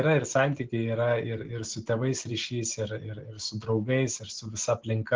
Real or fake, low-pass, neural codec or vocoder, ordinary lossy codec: real; 7.2 kHz; none; Opus, 16 kbps